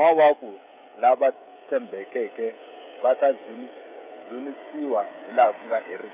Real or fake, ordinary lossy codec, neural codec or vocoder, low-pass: fake; none; codec, 16 kHz, 16 kbps, FreqCodec, smaller model; 3.6 kHz